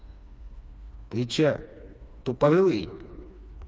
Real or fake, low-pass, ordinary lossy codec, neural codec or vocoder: fake; none; none; codec, 16 kHz, 2 kbps, FreqCodec, smaller model